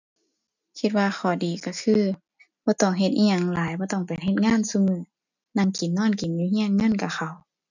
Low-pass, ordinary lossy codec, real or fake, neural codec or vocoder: 7.2 kHz; none; real; none